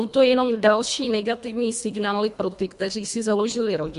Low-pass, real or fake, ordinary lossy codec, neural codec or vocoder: 10.8 kHz; fake; MP3, 64 kbps; codec, 24 kHz, 1.5 kbps, HILCodec